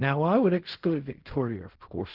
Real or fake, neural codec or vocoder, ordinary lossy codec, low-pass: fake; codec, 16 kHz in and 24 kHz out, 0.4 kbps, LongCat-Audio-Codec, fine tuned four codebook decoder; Opus, 16 kbps; 5.4 kHz